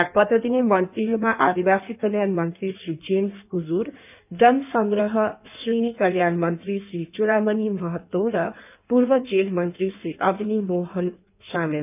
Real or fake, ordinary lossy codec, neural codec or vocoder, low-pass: fake; none; codec, 16 kHz in and 24 kHz out, 1.1 kbps, FireRedTTS-2 codec; 3.6 kHz